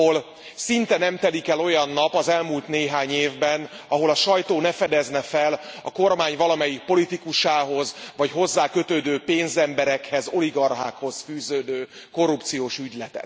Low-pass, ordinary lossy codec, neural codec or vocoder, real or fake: none; none; none; real